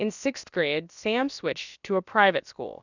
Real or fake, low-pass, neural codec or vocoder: fake; 7.2 kHz; codec, 16 kHz, about 1 kbps, DyCAST, with the encoder's durations